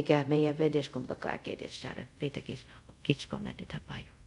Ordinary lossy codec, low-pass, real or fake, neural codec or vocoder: none; 10.8 kHz; fake; codec, 24 kHz, 0.5 kbps, DualCodec